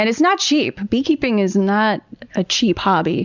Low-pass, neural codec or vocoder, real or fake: 7.2 kHz; none; real